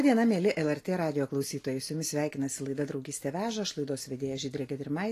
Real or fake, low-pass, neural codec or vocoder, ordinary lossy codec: real; 14.4 kHz; none; AAC, 48 kbps